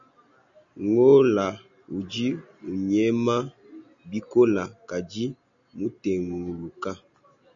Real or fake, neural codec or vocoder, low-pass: real; none; 7.2 kHz